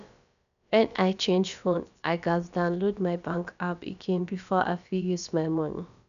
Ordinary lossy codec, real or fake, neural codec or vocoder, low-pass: none; fake; codec, 16 kHz, about 1 kbps, DyCAST, with the encoder's durations; 7.2 kHz